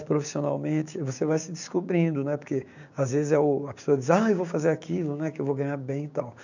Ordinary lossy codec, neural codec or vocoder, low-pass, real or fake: none; codec, 16 kHz, 6 kbps, DAC; 7.2 kHz; fake